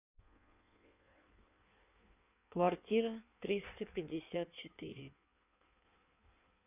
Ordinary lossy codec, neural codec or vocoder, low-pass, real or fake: none; codec, 16 kHz in and 24 kHz out, 1.1 kbps, FireRedTTS-2 codec; 3.6 kHz; fake